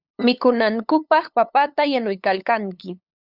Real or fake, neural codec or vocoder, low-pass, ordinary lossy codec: fake; codec, 16 kHz, 8 kbps, FunCodec, trained on LibriTTS, 25 frames a second; 5.4 kHz; Opus, 64 kbps